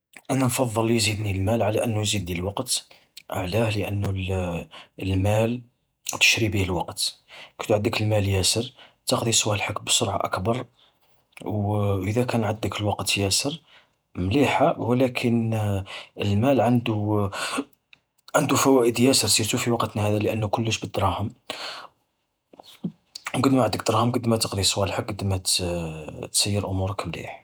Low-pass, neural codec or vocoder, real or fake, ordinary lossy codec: none; vocoder, 48 kHz, 128 mel bands, Vocos; fake; none